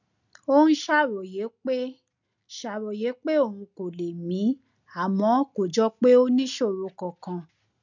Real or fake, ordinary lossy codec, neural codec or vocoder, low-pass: real; none; none; 7.2 kHz